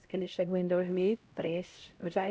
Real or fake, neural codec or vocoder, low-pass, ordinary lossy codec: fake; codec, 16 kHz, 0.5 kbps, X-Codec, HuBERT features, trained on LibriSpeech; none; none